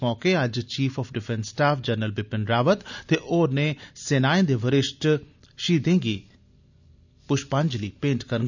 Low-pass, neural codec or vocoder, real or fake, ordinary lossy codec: 7.2 kHz; none; real; none